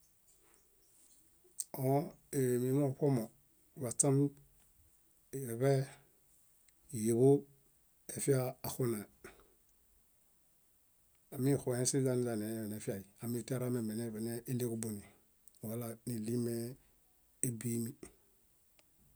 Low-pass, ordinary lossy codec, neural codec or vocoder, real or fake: none; none; none; real